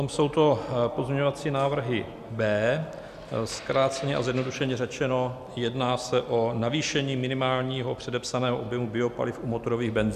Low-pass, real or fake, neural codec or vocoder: 14.4 kHz; real; none